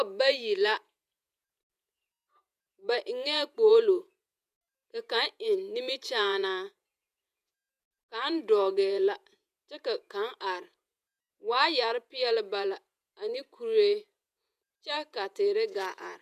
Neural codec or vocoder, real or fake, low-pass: vocoder, 48 kHz, 128 mel bands, Vocos; fake; 14.4 kHz